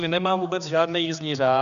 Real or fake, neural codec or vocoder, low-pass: fake; codec, 16 kHz, 2 kbps, X-Codec, HuBERT features, trained on general audio; 7.2 kHz